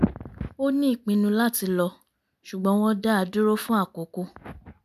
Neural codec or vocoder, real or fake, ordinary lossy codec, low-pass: none; real; MP3, 96 kbps; 14.4 kHz